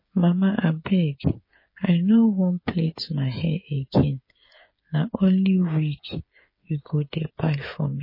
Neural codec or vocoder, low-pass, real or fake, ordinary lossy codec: codec, 16 kHz, 8 kbps, FreqCodec, smaller model; 5.4 kHz; fake; MP3, 24 kbps